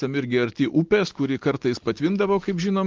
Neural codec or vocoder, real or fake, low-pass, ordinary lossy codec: none; real; 7.2 kHz; Opus, 16 kbps